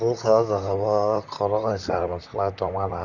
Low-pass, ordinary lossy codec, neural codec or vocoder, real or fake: 7.2 kHz; none; vocoder, 44.1 kHz, 128 mel bands, Pupu-Vocoder; fake